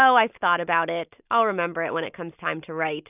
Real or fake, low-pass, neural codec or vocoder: real; 3.6 kHz; none